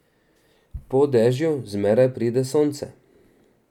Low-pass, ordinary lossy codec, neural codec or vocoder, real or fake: 19.8 kHz; none; none; real